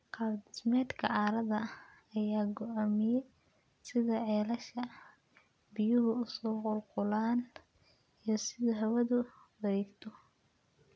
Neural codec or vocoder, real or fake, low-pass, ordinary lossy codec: none; real; none; none